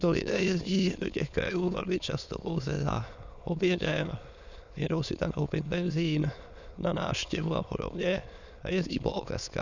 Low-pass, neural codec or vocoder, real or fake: 7.2 kHz; autoencoder, 22.05 kHz, a latent of 192 numbers a frame, VITS, trained on many speakers; fake